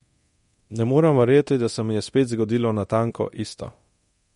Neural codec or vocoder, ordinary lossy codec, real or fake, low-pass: codec, 24 kHz, 0.9 kbps, DualCodec; MP3, 48 kbps; fake; 10.8 kHz